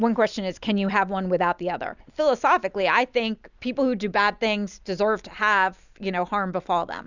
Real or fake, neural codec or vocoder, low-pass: real; none; 7.2 kHz